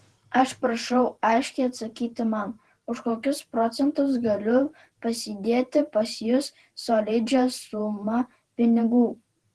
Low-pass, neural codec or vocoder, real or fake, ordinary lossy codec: 10.8 kHz; vocoder, 48 kHz, 128 mel bands, Vocos; fake; Opus, 16 kbps